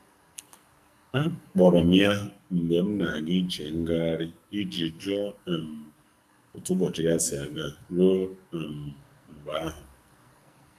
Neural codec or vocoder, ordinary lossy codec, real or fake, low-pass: codec, 44.1 kHz, 2.6 kbps, SNAC; none; fake; 14.4 kHz